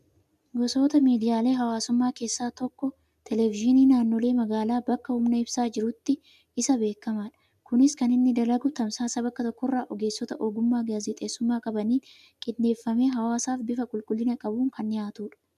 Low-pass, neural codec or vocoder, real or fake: 14.4 kHz; none; real